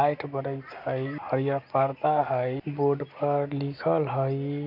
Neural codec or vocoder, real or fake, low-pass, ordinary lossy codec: none; real; 5.4 kHz; none